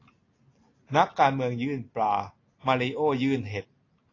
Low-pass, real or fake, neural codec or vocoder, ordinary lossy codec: 7.2 kHz; real; none; AAC, 32 kbps